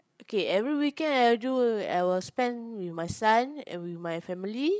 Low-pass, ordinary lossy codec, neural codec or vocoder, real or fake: none; none; codec, 16 kHz, 16 kbps, FreqCodec, larger model; fake